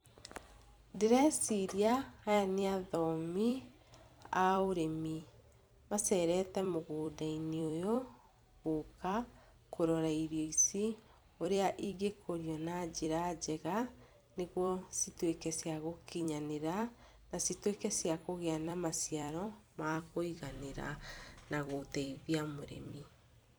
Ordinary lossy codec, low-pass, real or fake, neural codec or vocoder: none; none; fake; vocoder, 44.1 kHz, 128 mel bands every 256 samples, BigVGAN v2